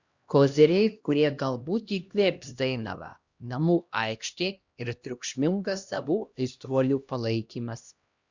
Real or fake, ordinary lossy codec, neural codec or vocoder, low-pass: fake; Opus, 64 kbps; codec, 16 kHz, 1 kbps, X-Codec, HuBERT features, trained on LibriSpeech; 7.2 kHz